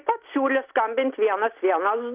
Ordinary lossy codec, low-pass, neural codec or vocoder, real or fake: Opus, 24 kbps; 3.6 kHz; none; real